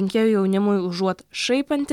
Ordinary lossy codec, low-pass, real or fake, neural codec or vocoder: MP3, 96 kbps; 19.8 kHz; real; none